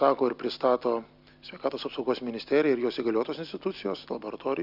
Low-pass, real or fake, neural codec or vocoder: 5.4 kHz; real; none